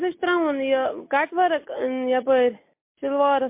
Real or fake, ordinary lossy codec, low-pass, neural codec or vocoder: real; MP3, 24 kbps; 3.6 kHz; none